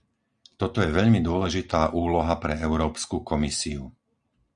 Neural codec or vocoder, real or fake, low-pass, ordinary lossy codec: none; real; 9.9 kHz; Opus, 64 kbps